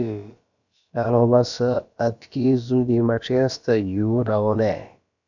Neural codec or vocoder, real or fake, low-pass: codec, 16 kHz, about 1 kbps, DyCAST, with the encoder's durations; fake; 7.2 kHz